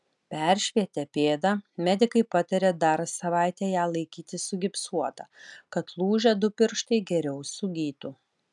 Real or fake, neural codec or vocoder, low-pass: real; none; 10.8 kHz